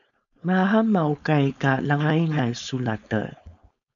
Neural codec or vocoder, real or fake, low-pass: codec, 16 kHz, 4.8 kbps, FACodec; fake; 7.2 kHz